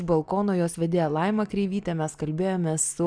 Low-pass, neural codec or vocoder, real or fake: 9.9 kHz; none; real